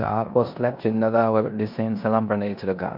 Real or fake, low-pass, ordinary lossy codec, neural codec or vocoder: fake; 5.4 kHz; MP3, 48 kbps; codec, 16 kHz in and 24 kHz out, 0.9 kbps, LongCat-Audio-Codec, four codebook decoder